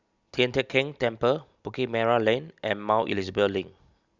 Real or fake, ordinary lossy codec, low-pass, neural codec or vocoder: real; Opus, 64 kbps; 7.2 kHz; none